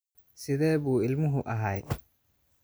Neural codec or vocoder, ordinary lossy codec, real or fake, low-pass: none; none; real; none